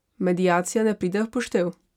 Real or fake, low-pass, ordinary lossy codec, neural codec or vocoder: real; 19.8 kHz; none; none